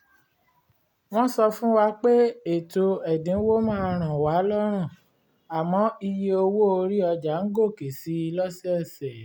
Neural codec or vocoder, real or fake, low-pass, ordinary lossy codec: none; real; 19.8 kHz; none